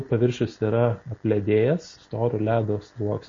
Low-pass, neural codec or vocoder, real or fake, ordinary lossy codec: 7.2 kHz; none; real; MP3, 32 kbps